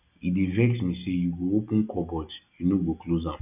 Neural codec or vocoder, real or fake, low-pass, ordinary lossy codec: none; real; 3.6 kHz; none